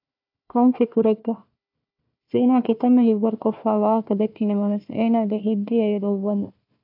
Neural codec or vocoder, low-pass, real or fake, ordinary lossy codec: codec, 16 kHz, 1 kbps, FunCodec, trained on Chinese and English, 50 frames a second; 5.4 kHz; fake; AAC, 48 kbps